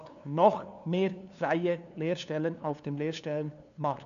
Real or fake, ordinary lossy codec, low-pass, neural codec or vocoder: fake; none; 7.2 kHz; codec, 16 kHz, 2 kbps, FunCodec, trained on LibriTTS, 25 frames a second